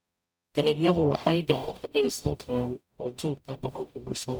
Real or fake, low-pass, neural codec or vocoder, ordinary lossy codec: fake; none; codec, 44.1 kHz, 0.9 kbps, DAC; none